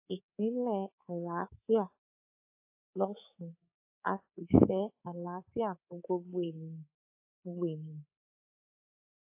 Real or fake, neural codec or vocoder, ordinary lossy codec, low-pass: fake; codec, 24 kHz, 3.1 kbps, DualCodec; AAC, 32 kbps; 3.6 kHz